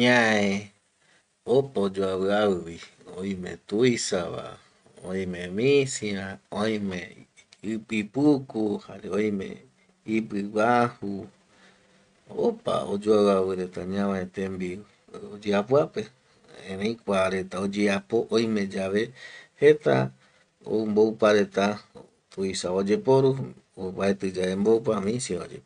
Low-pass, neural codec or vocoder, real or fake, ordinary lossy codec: 9.9 kHz; none; real; none